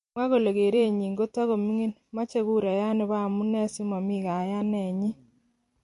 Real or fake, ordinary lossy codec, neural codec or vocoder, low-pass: fake; MP3, 48 kbps; vocoder, 44.1 kHz, 128 mel bands every 256 samples, BigVGAN v2; 14.4 kHz